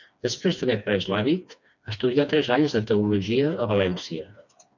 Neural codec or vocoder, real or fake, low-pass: codec, 16 kHz, 2 kbps, FreqCodec, smaller model; fake; 7.2 kHz